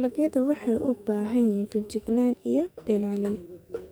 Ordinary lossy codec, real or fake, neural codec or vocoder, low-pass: none; fake; codec, 44.1 kHz, 2.6 kbps, SNAC; none